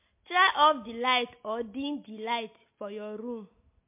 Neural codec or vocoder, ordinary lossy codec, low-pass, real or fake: none; MP3, 24 kbps; 3.6 kHz; real